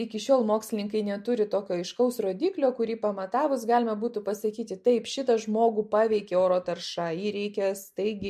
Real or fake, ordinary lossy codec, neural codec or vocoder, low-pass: real; MP3, 64 kbps; none; 14.4 kHz